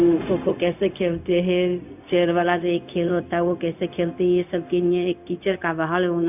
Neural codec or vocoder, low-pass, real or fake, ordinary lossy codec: codec, 16 kHz, 0.4 kbps, LongCat-Audio-Codec; 3.6 kHz; fake; none